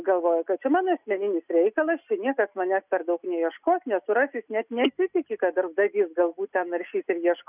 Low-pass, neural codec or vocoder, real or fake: 3.6 kHz; none; real